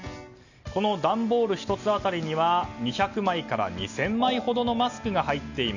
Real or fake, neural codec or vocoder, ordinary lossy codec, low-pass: real; none; none; 7.2 kHz